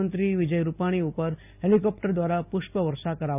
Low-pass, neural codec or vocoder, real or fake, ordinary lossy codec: 3.6 kHz; none; real; none